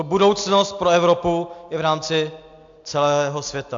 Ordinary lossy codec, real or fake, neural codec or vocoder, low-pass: AAC, 64 kbps; real; none; 7.2 kHz